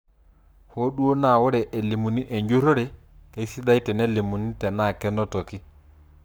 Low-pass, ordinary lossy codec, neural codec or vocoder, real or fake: none; none; codec, 44.1 kHz, 7.8 kbps, Pupu-Codec; fake